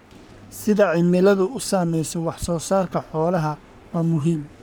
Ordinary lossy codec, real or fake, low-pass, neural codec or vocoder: none; fake; none; codec, 44.1 kHz, 3.4 kbps, Pupu-Codec